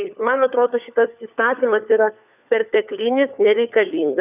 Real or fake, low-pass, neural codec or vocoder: fake; 3.6 kHz; codec, 16 kHz, 4 kbps, FunCodec, trained on LibriTTS, 50 frames a second